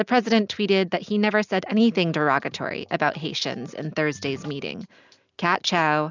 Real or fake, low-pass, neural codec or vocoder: real; 7.2 kHz; none